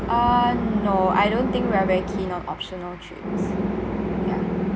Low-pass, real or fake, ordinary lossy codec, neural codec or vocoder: none; real; none; none